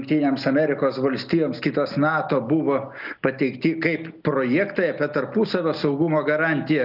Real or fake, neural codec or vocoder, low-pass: real; none; 5.4 kHz